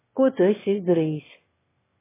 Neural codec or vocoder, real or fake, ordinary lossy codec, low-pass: autoencoder, 22.05 kHz, a latent of 192 numbers a frame, VITS, trained on one speaker; fake; MP3, 16 kbps; 3.6 kHz